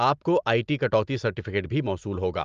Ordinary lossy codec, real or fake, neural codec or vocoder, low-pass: Opus, 32 kbps; real; none; 7.2 kHz